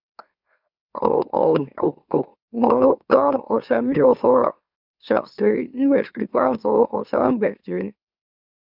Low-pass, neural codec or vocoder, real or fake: 5.4 kHz; autoencoder, 44.1 kHz, a latent of 192 numbers a frame, MeloTTS; fake